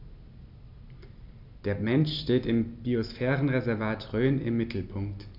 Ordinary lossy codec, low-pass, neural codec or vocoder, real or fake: none; 5.4 kHz; none; real